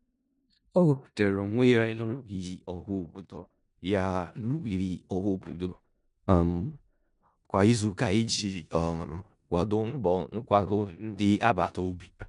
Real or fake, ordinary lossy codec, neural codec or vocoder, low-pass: fake; none; codec, 16 kHz in and 24 kHz out, 0.4 kbps, LongCat-Audio-Codec, four codebook decoder; 10.8 kHz